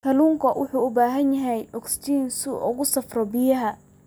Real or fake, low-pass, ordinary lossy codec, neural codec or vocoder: real; none; none; none